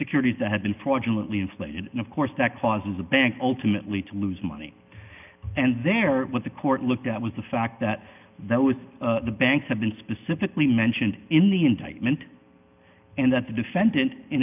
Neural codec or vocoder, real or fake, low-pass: none; real; 3.6 kHz